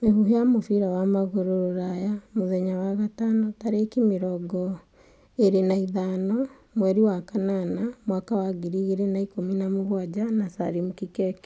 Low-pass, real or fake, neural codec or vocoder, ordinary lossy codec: none; real; none; none